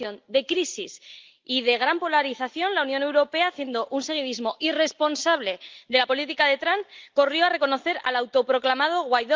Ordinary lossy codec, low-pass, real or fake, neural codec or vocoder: Opus, 24 kbps; 7.2 kHz; real; none